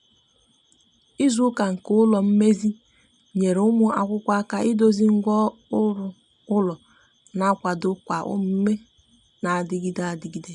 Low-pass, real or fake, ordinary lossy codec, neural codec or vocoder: 10.8 kHz; real; none; none